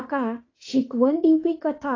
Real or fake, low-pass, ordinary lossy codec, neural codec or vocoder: fake; 7.2 kHz; AAC, 32 kbps; autoencoder, 48 kHz, 32 numbers a frame, DAC-VAE, trained on Japanese speech